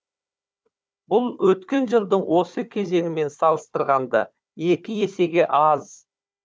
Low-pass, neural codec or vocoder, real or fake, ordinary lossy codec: none; codec, 16 kHz, 4 kbps, FunCodec, trained on Chinese and English, 50 frames a second; fake; none